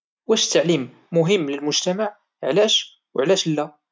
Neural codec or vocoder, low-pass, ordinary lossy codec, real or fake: none; none; none; real